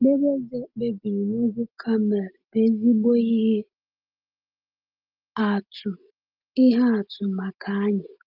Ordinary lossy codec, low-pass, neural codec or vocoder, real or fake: Opus, 24 kbps; 5.4 kHz; none; real